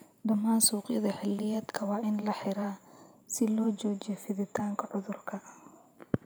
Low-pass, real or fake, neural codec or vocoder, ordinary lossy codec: none; fake; vocoder, 44.1 kHz, 128 mel bands every 512 samples, BigVGAN v2; none